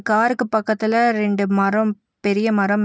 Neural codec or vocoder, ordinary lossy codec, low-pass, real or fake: none; none; none; real